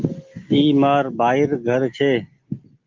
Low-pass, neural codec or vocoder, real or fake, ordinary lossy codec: 7.2 kHz; none; real; Opus, 32 kbps